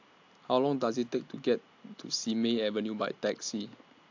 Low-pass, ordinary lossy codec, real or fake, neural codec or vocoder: 7.2 kHz; AAC, 48 kbps; real; none